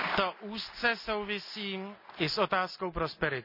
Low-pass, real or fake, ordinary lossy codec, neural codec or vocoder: 5.4 kHz; real; none; none